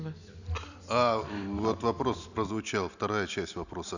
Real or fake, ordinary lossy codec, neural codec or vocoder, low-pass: real; none; none; 7.2 kHz